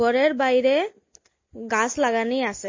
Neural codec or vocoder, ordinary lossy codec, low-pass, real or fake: none; MP3, 32 kbps; 7.2 kHz; real